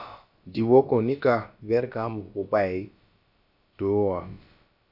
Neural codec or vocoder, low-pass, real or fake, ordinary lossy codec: codec, 16 kHz, about 1 kbps, DyCAST, with the encoder's durations; 5.4 kHz; fake; AAC, 48 kbps